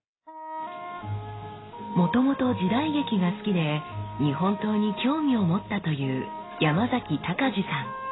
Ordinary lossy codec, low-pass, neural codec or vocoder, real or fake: AAC, 16 kbps; 7.2 kHz; none; real